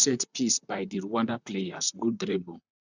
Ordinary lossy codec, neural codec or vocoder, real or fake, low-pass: none; vocoder, 44.1 kHz, 128 mel bands, Pupu-Vocoder; fake; 7.2 kHz